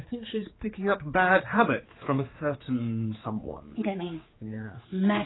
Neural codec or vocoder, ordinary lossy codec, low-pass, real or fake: codec, 16 kHz, 4 kbps, X-Codec, HuBERT features, trained on general audio; AAC, 16 kbps; 7.2 kHz; fake